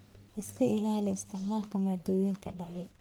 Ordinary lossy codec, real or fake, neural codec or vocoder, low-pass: none; fake; codec, 44.1 kHz, 1.7 kbps, Pupu-Codec; none